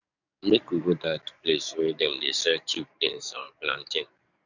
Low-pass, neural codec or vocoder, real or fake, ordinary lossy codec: 7.2 kHz; codec, 44.1 kHz, 7.8 kbps, DAC; fake; none